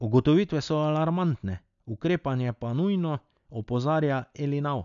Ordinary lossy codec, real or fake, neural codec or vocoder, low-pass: none; real; none; 7.2 kHz